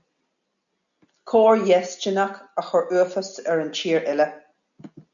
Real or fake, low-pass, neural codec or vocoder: real; 7.2 kHz; none